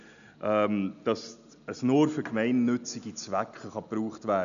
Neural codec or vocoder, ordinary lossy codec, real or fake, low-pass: none; none; real; 7.2 kHz